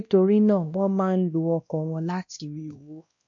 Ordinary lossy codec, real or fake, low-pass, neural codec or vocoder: MP3, 64 kbps; fake; 7.2 kHz; codec, 16 kHz, 1 kbps, X-Codec, WavLM features, trained on Multilingual LibriSpeech